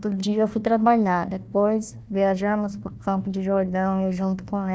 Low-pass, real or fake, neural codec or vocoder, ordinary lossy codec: none; fake; codec, 16 kHz, 1 kbps, FunCodec, trained on Chinese and English, 50 frames a second; none